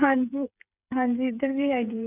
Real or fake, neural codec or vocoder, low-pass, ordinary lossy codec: fake; codec, 16 kHz, 8 kbps, FreqCodec, smaller model; 3.6 kHz; none